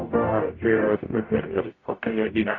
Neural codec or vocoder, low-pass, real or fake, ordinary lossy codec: codec, 44.1 kHz, 0.9 kbps, DAC; 7.2 kHz; fake; AAC, 32 kbps